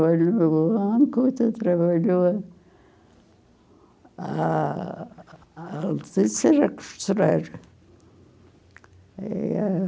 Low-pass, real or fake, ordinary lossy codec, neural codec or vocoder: none; real; none; none